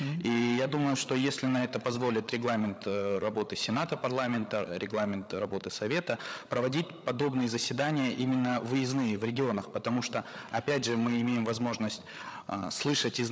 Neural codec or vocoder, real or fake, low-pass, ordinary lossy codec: codec, 16 kHz, 8 kbps, FreqCodec, larger model; fake; none; none